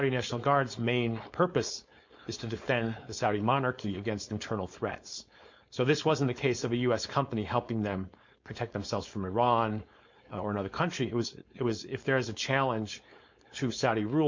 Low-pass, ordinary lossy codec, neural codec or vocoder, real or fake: 7.2 kHz; MP3, 48 kbps; codec, 16 kHz, 4.8 kbps, FACodec; fake